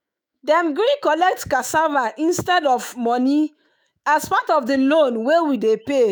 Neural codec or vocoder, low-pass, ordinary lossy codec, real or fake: autoencoder, 48 kHz, 128 numbers a frame, DAC-VAE, trained on Japanese speech; none; none; fake